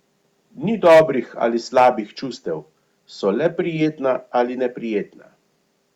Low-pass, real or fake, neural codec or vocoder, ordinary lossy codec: 19.8 kHz; real; none; Opus, 64 kbps